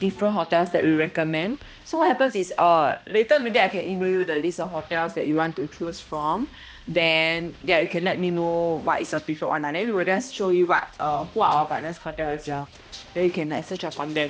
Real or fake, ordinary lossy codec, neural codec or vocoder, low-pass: fake; none; codec, 16 kHz, 1 kbps, X-Codec, HuBERT features, trained on balanced general audio; none